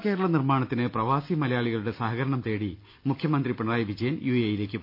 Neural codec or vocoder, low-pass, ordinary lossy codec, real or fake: none; 5.4 kHz; none; real